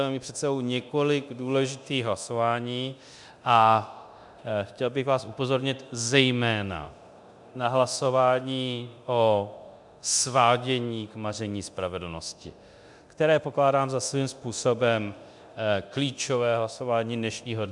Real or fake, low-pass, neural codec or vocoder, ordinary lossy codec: fake; 10.8 kHz; codec, 24 kHz, 0.9 kbps, DualCodec; MP3, 96 kbps